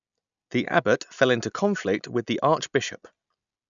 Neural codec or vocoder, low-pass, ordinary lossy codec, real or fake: none; 7.2 kHz; none; real